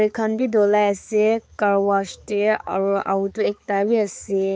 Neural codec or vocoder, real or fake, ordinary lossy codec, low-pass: codec, 16 kHz, 4 kbps, X-Codec, HuBERT features, trained on balanced general audio; fake; none; none